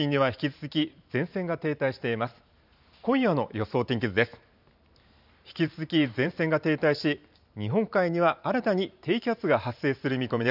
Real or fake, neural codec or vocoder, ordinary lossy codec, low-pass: fake; vocoder, 44.1 kHz, 128 mel bands every 512 samples, BigVGAN v2; none; 5.4 kHz